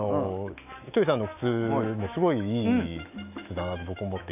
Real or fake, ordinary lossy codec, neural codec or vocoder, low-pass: fake; none; autoencoder, 48 kHz, 128 numbers a frame, DAC-VAE, trained on Japanese speech; 3.6 kHz